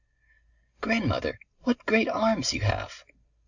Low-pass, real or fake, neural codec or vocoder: 7.2 kHz; real; none